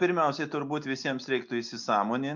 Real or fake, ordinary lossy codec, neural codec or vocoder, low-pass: real; MP3, 64 kbps; none; 7.2 kHz